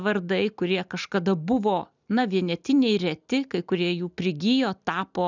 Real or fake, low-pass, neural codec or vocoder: real; 7.2 kHz; none